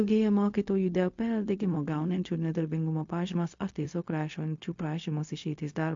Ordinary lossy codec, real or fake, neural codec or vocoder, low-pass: MP3, 48 kbps; fake; codec, 16 kHz, 0.4 kbps, LongCat-Audio-Codec; 7.2 kHz